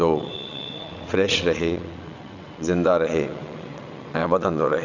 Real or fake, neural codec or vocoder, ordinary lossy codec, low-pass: fake; vocoder, 22.05 kHz, 80 mel bands, WaveNeXt; none; 7.2 kHz